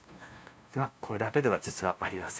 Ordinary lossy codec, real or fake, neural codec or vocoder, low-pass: none; fake; codec, 16 kHz, 0.5 kbps, FunCodec, trained on LibriTTS, 25 frames a second; none